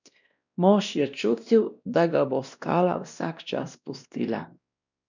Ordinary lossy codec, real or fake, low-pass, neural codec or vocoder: none; fake; 7.2 kHz; codec, 16 kHz, 1 kbps, X-Codec, WavLM features, trained on Multilingual LibriSpeech